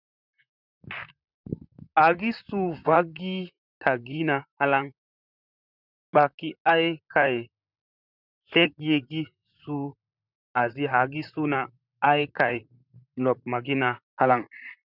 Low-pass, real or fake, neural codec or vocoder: 5.4 kHz; fake; vocoder, 44.1 kHz, 128 mel bands, Pupu-Vocoder